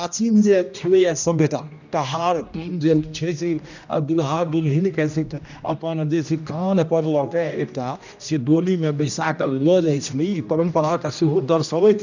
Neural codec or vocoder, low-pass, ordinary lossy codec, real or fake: codec, 16 kHz, 1 kbps, X-Codec, HuBERT features, trained on general audio; 7.2 kHz; none; fake